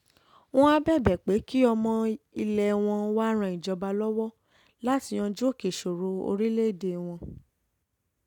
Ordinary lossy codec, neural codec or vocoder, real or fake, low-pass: none; none; real; 19.8 kHz